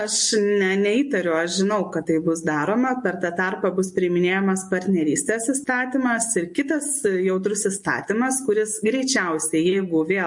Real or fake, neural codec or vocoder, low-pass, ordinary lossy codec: real; none; 10.8 kHz; MP3, 48 kbps